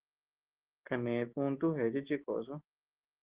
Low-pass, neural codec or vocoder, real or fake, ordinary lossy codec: 3.6 kHz; none; real; Opus, 16 kbps